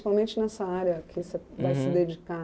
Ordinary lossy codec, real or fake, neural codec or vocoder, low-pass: none; real; none; none